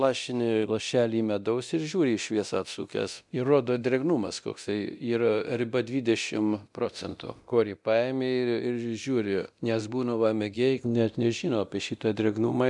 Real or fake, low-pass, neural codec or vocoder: fake; 10.8 kHz; codec, 24 kHz, 0.9 kbps, DualCodec